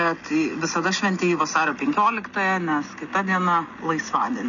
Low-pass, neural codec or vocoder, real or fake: 7.2 kHz; none; real